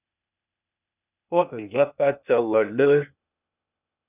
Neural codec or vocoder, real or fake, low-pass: codec, 16 kHz, 0.8 kbps, ZipCodec; fake; 3.6 kHz